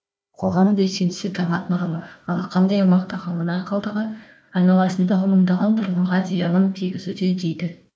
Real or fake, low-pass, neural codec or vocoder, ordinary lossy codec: fake; none; codec, 16 kHz, 1 kbps, FunCodec, trained on Chinese and English, 50 frames a second; none